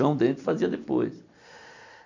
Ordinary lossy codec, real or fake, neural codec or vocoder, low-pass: none; real; none; 7.2 kHz